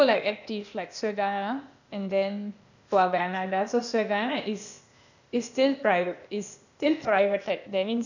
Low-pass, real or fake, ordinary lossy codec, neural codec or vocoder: 7.2 kHz; fake; none; codec, 16 kHz, 0.8 kbps, ZipCodec